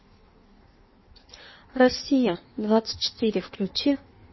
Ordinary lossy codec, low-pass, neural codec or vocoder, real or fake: MP3, 24 kbps; 7.2 kHz; codec, 16 kHz in and 24 kHz out, 1.1 kbps, FireRedTTS-2 codec; fake